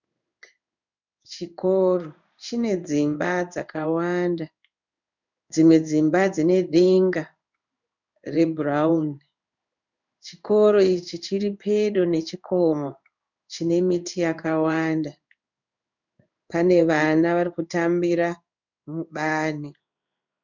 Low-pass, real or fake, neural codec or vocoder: 7.2 kHz; fake; codec, 16 kHz in and 24 kHz out, 1 kbps, XY-Tokenizer